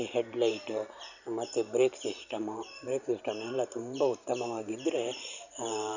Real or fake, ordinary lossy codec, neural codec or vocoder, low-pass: fake; none; vocoder, 44.1 kHz, 128 mel bands every 512 samples, BigVGAN v2; 7.2 kHz